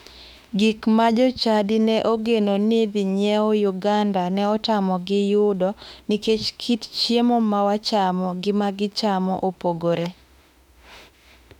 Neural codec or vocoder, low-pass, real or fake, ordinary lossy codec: autoencoder, 48 kHz, 32 numbers a frame, DAC-VAE, trained on Japanese speech; 19.8 kHz; fake; none